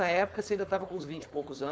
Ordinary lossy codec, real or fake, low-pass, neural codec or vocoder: none; fake; none; codec, 16 kHz, 4.8 kbps, FACodec